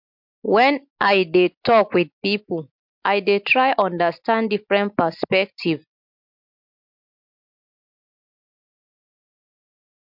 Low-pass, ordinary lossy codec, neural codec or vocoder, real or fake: 5.4 kHz; MP3, 48 kbps; none; real